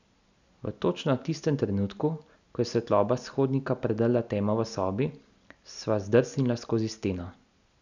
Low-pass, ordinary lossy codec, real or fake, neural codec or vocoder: 7.2 kHz; none; real; none